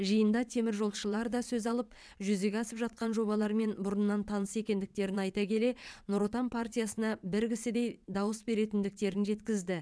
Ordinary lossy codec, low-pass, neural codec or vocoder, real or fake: none; none; vocoder, 22.05 kHz, 80 mel bands, WaveNeXt; fake